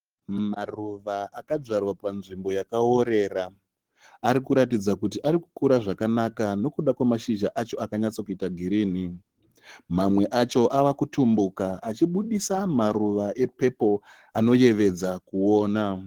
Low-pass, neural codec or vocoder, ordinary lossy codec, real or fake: 19.8 kHz; codec, 44.1 kHz, 7.8 kbps, Pupu-Codec; Opus, 16 kbps; fake